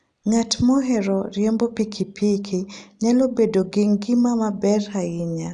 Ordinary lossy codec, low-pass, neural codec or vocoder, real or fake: none; 9.9 kHz; none; real